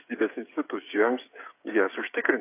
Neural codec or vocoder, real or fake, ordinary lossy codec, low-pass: codec, 16 kHz, 8 kbps, FreqCodec, smaller model; fake; AAC, 24 kbps; 3.6 kHz